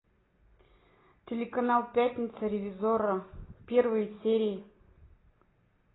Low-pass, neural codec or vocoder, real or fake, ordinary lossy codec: 7.2 kHz; none; real; AAC, 16 kbps